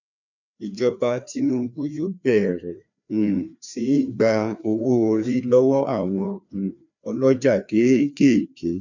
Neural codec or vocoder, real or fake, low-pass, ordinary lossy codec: codec, 16 kHz, 2 kbps, FreqCodec, larger model; fake; 7.2 kHz; none